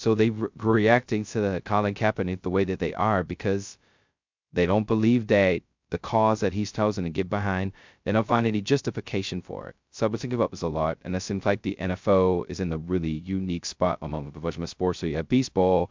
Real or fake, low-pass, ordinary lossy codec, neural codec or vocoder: fake; 7.2 kHz; MP3, 64 kbps; codec, 16 kHz, 0.2 kbps, FocalCodec